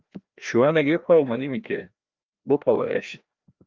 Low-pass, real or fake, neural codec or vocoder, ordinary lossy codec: 7.2 kHz; fake; codec, 16 kHz, 1 kbps, FreqCodec, larger model; Opus, 24 kbps